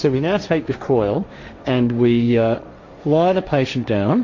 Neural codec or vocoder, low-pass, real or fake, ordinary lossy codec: codec, 16 kHz, 1.1 kbps, Voila-Tokenizer; 7.2 kHz; fake; MP3, 48 kbps